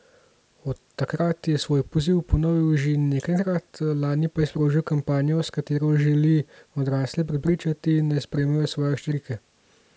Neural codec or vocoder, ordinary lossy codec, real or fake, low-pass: none; none; real; none